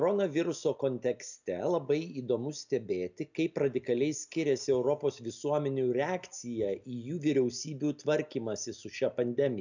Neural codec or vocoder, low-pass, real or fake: none; 7.2 kHz; real